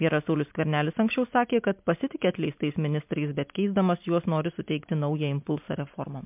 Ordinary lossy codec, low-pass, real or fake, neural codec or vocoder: MP3, 32 kbps; 3.6 kHz; real; none